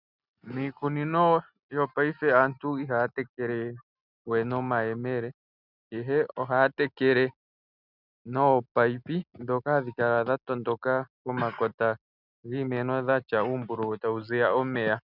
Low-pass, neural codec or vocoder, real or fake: 5.4 kHz; none; real